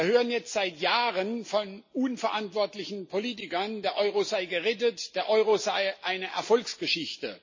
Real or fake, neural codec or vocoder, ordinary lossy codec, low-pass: real; none; MP3, 32 kbps; 7.2 kHz